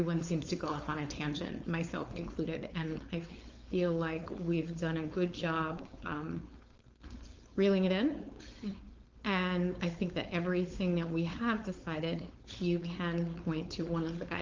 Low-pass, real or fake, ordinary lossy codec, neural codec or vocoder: 7.2 kHz; fake; Opus, 32 kbps; codec, 16 kHz, 4.8 kbps, FACodec